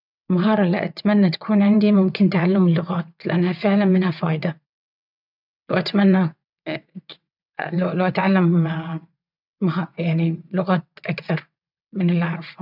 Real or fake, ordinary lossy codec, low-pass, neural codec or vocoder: fake; none; 5.4 kHz; vocoder, 22.05 kHz, 80 mel bands, WaveNeXt